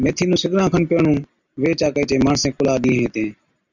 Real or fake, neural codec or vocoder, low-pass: real; none; 7.2 kHz